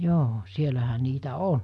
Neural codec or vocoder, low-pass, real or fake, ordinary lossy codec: none; none; real; none